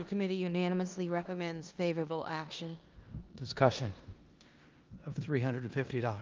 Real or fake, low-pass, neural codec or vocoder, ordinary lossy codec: fake; 7.2 kHz; codec, 16 kHz in and 24 kHz out, 0.9 kbps, LongCat-Audio-Codec, four codebook decoder; Opus, 32 kbps